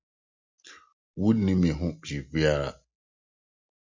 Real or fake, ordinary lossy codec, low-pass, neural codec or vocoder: real; MP3, 64 kbps; 7.2 kHz; none